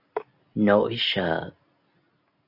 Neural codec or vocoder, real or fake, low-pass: none; real; 5.4 kHz